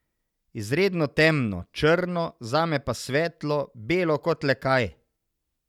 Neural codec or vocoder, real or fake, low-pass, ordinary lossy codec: none; real; 19.8 kHz; none